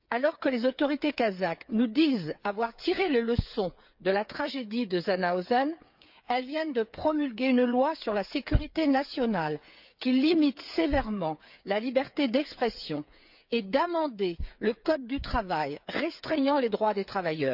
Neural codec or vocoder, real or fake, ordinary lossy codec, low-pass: codec, 16 kHz, 8 kbps, FreqCodec, smaller model; fake; none; 5.4 kHz